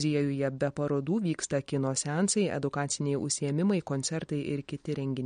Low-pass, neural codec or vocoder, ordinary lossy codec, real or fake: 9.9 kHz; none; MP3, 48 kbps; real